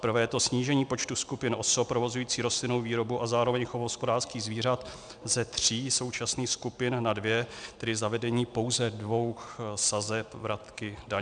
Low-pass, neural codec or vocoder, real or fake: 9.9 kHz; none; real